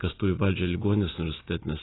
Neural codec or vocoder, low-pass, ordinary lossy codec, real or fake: none; 7.2 kHz; AAC, 16 kbps; real